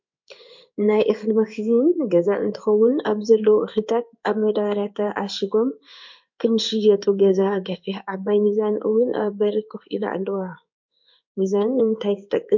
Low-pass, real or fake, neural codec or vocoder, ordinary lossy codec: 7.2 kHz; fake; codec, 16 kHz in and 24 kHz out, 1 kbps, XY-Tokenizer; MP3, 48 kbps